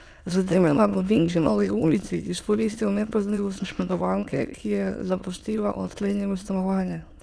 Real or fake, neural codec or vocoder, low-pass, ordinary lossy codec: fake; autoencoder, 22.05 kHz, a latent of 192 numbers a frame, VITS, trained on many speakers; none; none